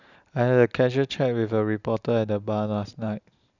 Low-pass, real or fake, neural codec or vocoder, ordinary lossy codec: 7.2 kHz; real; none; none